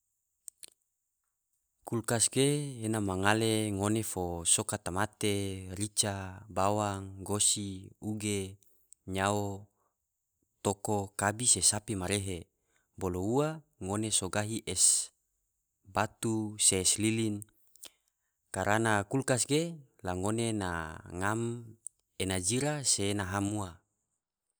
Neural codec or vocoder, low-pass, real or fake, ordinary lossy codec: none; none; real; none